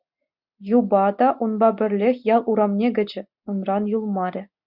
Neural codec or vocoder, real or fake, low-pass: none; real; 5.4 kHz